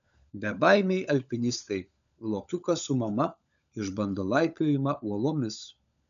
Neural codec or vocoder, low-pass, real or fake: codec, 16 kHz, 16 kbps, FunCodec, trained on LibriTTS, 50 frames a second; 7.2 kHz; fake